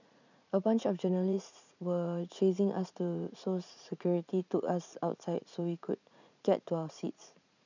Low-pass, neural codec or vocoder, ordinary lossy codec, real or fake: 7.2 kHz; vocoder, 44.1 kHz, 80 mel bands, Vocos; none; fake